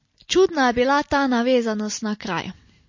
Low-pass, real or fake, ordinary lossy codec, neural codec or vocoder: 7.2 kHz; real; MP3, 32 kbps; none